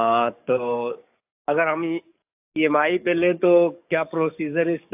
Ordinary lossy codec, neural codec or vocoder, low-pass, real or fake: AAC, 32 kbps; codec, 44.1 kHz, 7.8 kbps, DAC; 3.6 kHz; fake